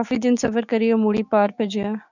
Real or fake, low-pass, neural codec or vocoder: fake; 7.2 kHz; codec, 16 kHz, 6 kbps, DAC